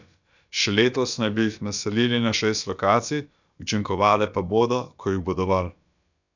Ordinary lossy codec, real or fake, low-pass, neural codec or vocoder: none; fake; 7.2 kHz; codec, 16 kHz, about 1 kbps, DyCAST, with the encoder's durations